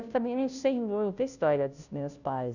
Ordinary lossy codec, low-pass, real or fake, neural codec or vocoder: none; 7.2 kHz; fake; codec, 16 kHz, 0.5 kbps, FunCodec, trained on Chinese and English, 25 frames a second